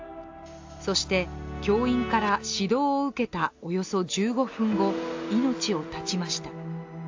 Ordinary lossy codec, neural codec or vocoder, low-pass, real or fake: AAC, 48 kbps; none; 7.2 kHz; real